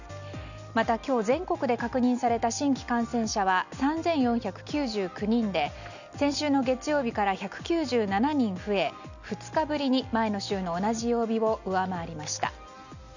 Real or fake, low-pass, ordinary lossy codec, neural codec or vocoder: real; 7.2 kHz; none; none